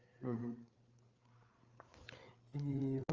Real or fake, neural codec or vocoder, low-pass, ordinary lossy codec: fake; codec, 16 kHz, 8 kbps, FreqCodec, larger model; 7.2 kHz; Opus, 24 kbps